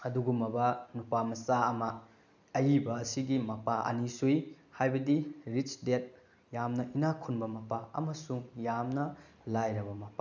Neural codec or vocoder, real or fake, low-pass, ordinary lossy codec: none; real; 7.2 kHz; none